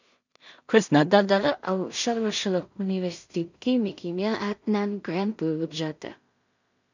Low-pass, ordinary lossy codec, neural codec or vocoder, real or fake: 7.2 kHz; none; codec, 16 kHz in and 24 kHz out, 0.4 kbps, LongCat-Audio-Codec, two codebook decoder; fake